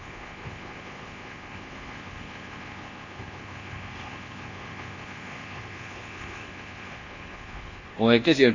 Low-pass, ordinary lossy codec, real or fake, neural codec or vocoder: 7.2 kHz; AAC, 32 kbps; fake; codec, 24 kHz, 1.2 kbps, DualCodec